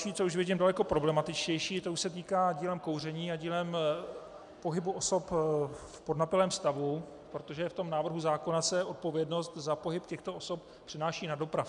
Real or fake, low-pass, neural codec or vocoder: real; 10.8 kHz; none